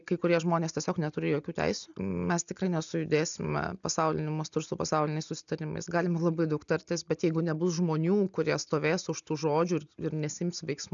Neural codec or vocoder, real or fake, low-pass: none; real; 7.2 kHz